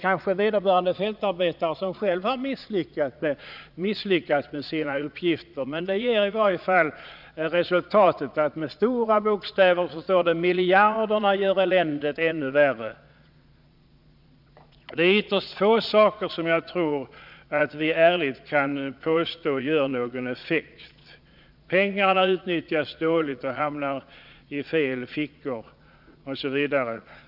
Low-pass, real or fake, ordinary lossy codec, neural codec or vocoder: 5.4 kHz; fake; none; vocoder, 22.05 kHz, 80 mel bands, Vocos